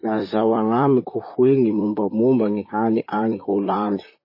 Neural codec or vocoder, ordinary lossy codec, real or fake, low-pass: vocoder, 44.1 kHz, 128 mel bands, Pupu-Vocoder; MP3, 24 kbps; fake; 5.4 kHz